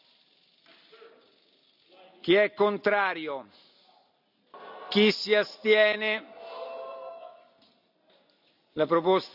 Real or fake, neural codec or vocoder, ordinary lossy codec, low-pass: real; none; none; 5.4 kHz